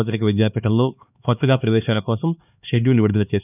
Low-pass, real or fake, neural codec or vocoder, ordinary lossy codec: 3.6 kHz; fake; codec, 16 kHz, 2 kbps, X-Codec, HuBERT features, trained on LibriSpeech; none